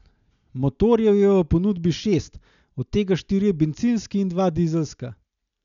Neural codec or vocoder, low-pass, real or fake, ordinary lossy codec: none; 7.2 kHz; real; none